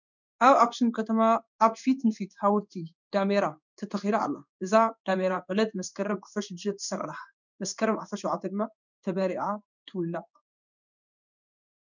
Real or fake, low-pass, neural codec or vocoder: fake; 7.2 kHz; codec, 16 kHz in and 24 kHz out, 1 kbps, XY-Tokenizer